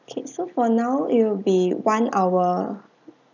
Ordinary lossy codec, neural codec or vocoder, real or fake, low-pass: none; none; real; 7.2 kHz